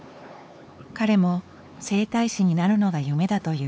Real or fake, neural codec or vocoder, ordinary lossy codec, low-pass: fake; codec, 16 kHz, 4 kbps, X-Codec, HuBERT features, trained on LibriSpeech; none; none